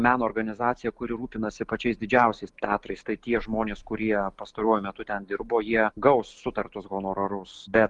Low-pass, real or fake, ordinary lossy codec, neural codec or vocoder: 10.8 kHz; real; Opus, 32 kbps; none